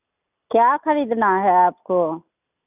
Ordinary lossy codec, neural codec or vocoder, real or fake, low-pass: none; none; real; 3.6 kHz